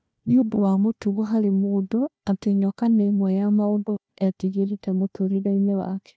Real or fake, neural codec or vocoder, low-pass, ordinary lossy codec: fake; codec, 16 kHz, 1 kbps, FunCodec, trained on Chinese and English, 50 frames a second; none; none